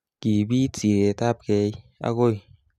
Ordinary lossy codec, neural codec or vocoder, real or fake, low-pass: none; none; real; 14.4 kHz